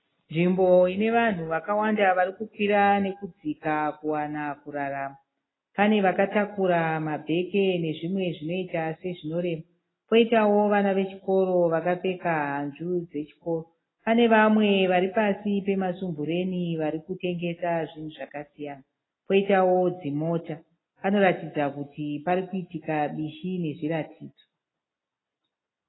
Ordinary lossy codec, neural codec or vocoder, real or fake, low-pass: AAC, 16 kbps; none; real; 7.2 kHz